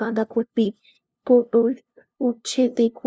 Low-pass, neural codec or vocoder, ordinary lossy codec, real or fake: none; codec, 16 kHz, 0.5 kbps, FunCodec, trained on LibriTTS, 25 frames a second; none; fake